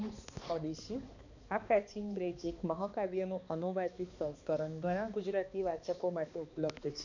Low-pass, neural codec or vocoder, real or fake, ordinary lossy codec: 7.2 kHz; codec, 16 kHz, 2 kbps, X-Codec, HuBERT features, trained on balanced general audio; fake; none